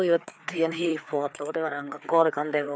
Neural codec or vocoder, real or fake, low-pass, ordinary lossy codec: codec, 16 kHz, 4 kbps, FreqCodec, larger model; fake; none; none